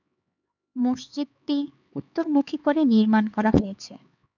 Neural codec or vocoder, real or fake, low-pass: codec, 16 kHz, 4 kbps, X-Codec, HuBERT features, trained on LibriSpeech; fake; 7.2 kHz